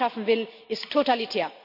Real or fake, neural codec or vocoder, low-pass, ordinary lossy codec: real; none; 5.4 kHz; none